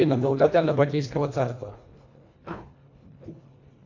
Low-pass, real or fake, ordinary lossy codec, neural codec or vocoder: 7.2 kHz; fake; AAC, 48 kbps; codec, 24 kHz, 1.5 kbps, HILCodec